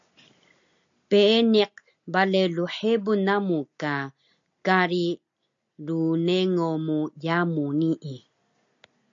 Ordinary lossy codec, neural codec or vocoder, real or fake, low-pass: AAC, 64 kbps; none; real; 7.2 kHz